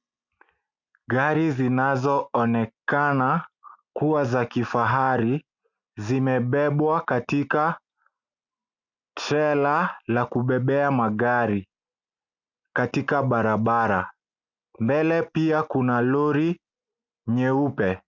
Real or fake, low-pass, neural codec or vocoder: real; 7.2 kHz; none